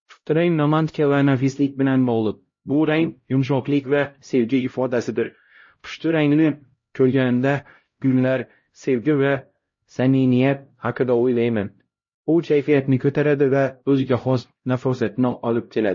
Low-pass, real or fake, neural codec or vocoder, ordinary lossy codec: 7.2 kHz; fake; codec, 16 kHz, 0.5 kbps, X-Codec, HuBERT features, trained on LibriSpeech; MP3, 32 kbps